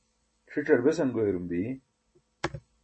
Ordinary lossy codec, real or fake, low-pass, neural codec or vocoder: MP3, 32 kbps; real; 10.8 kHz; none